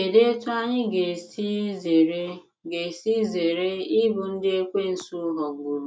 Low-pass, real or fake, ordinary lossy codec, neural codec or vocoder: none; real; none; none